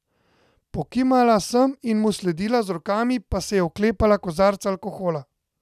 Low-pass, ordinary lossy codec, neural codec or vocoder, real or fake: 14.4 kHz; none; none; real